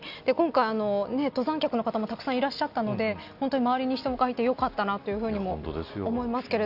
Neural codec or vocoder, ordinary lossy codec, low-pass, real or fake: none; none; 5.4 kHz; real